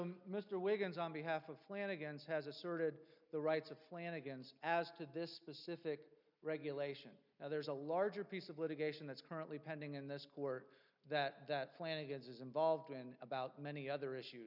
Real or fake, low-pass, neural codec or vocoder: real; 5.4 kHz; none